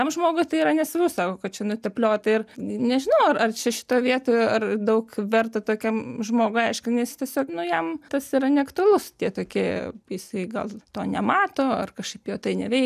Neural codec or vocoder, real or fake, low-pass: none; real; 14.4 kHz